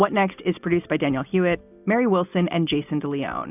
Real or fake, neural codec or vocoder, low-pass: real; none; 3.6 kHz